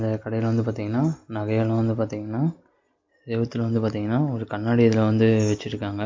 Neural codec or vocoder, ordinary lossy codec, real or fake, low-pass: none; MP3, 48 kbps; real; 7.2 kHz